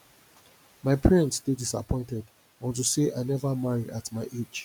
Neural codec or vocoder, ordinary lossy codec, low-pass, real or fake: none; none; none; real